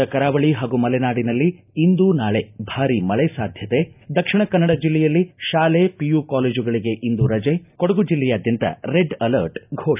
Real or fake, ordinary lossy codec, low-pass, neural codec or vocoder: real; none; 3.6 kHz; none